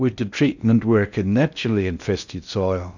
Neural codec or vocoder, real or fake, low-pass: codec, 16 kHz in and 24 kHz out, 0.6 kbps, FocalCodec, streaming, 4096 codes; fake; 7.2 kHz